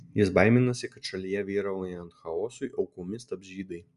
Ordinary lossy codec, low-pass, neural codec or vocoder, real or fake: MP3, 96 kbps; 10.8 kHz; none; real